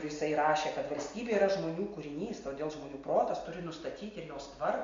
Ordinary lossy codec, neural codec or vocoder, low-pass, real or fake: MP3, 48 kbps; none; 7.2 kHz; real